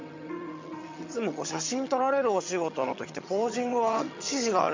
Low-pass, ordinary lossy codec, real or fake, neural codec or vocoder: 7.2 kHz; MP3, 64 kbps; fake; vocoder, 22.05 kHz, 80 mel bands, HiFi-GAN